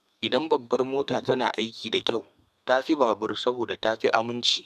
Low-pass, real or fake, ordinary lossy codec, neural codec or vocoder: 14.4 kHz; fake; none; codec, 32 kHz, 1.9 kbps, SNAC